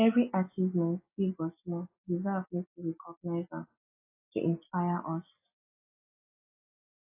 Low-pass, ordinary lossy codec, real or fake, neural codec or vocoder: 3.6 kHz; AAC, 32 kbps; real; none